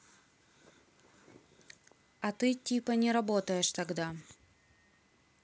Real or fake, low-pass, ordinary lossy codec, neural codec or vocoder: real; none; none; none